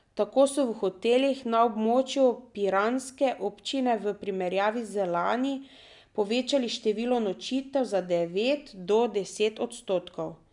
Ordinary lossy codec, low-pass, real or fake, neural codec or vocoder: none; 10.8 kHz; real; none